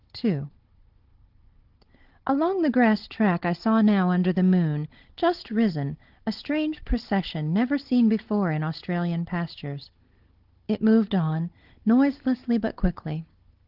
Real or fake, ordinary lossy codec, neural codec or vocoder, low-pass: fake; Opus, 16 kbps; codec, 16 kHz, 16 kbps, FunCodec, trained on Chinese and English, 50 frames a second; 5.4 kHz